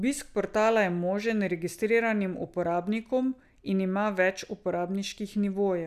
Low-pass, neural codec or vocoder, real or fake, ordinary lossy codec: 14.4 kHz; none; real; none